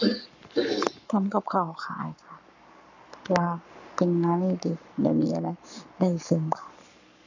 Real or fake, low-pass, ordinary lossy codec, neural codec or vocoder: fake; 7.2 kHz; none; codec, 44.1 kHz, 7.8 kbps, Pupu-Codec